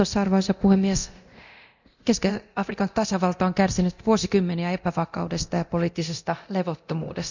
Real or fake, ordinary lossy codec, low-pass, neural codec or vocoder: fake; none; 7.2 kHz; codec, 24 kHz, 0.9 kbps, DualCodec